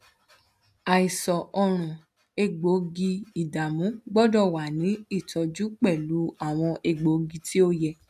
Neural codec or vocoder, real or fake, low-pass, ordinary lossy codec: none; real; 14.4 kHz; none